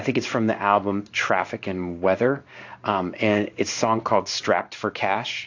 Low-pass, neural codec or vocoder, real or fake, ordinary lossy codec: 7.2 kHz; codec, 16 kHz in and 24 kHz out, 1 kbps, XY-Tokenizer; fake; AAC, 48 kbps